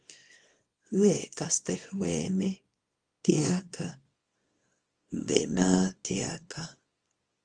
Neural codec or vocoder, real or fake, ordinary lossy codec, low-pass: codec, 24 kHz, 0.9 kbps, WavTokenizer, small release; fake; Opus, 24 kbps; 9.9 kHz